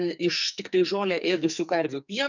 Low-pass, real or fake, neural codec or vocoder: 7.2 kHz; fake; codec, 44.1 kHz, 2.6 kbps, SNAC